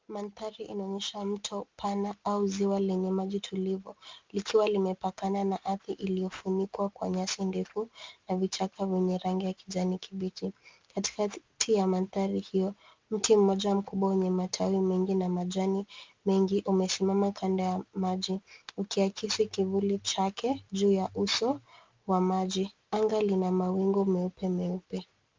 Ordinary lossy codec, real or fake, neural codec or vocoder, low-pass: Opus, 32 kbps; real; none; 7.2 kHz